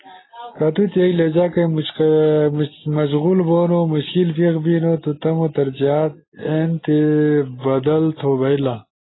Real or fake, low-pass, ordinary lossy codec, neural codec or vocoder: real; 7.2 kHz; AAC, 16 kbps; none